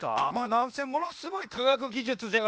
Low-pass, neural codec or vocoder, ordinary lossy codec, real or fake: none; codec, 16 kHz, 0.8 kbps, ZipCodec; none; fake